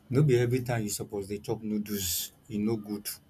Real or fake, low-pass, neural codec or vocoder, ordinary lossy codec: real; 14.4 kHz; none; none